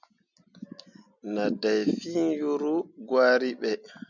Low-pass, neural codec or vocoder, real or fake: 7.2 kHz; none; real